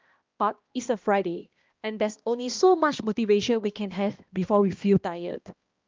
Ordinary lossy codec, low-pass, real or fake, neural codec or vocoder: Opus, 24 kbps; 7.2 kHz; fake; codec, 16 kHz, 1 kbps, X-Codec, HuBERT features, trained on balanced general audio